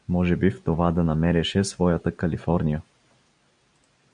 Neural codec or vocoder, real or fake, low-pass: none; real; 9.9 kHz